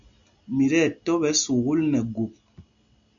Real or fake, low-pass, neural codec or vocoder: real; 7.2 kHz; none